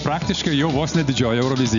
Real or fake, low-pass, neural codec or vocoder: real; 7.2 kHz; none